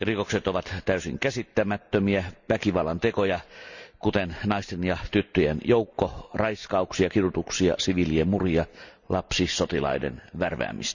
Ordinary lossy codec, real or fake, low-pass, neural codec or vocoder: none; real; 7.2 kHz; none